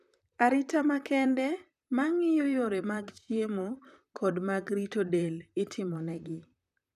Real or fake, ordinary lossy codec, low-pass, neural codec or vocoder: fake; none; 14.4 kHz; vocoder, 44.1 kHz, 128 mel bands, Pupu-Vocoder